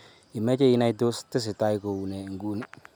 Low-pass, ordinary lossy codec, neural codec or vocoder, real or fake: none; none; none; real